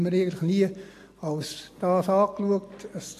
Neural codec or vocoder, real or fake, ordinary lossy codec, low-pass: none; real; AAC, 64 kbps; 14.4 kHz